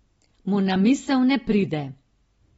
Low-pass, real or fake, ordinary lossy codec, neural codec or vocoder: 19.8 kHz; real; AAC, 24 kbps; none